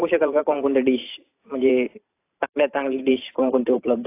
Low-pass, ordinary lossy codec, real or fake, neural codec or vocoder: 3.6 kHz; AAC, 24 kbps; fake; vocoder, 44.1 kHz, 128 mel bands every 512 samples, BigVGAN v2